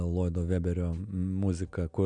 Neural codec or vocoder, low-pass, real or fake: none; 9.9 kHz; real